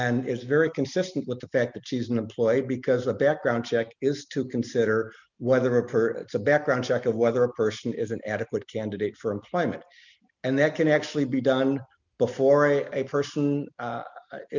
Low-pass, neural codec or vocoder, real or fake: 7.2 kHz; none; real